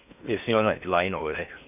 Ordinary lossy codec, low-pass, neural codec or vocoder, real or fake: none; 3.6 kHz; codec, 16 kHz in and 24 kHz out, 0.6 kbps, FocalCodec, streaming, 4096 codes; fake